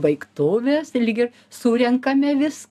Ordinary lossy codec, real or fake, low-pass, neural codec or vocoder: AAC, 96 kbps; real; 14.4 kHz; none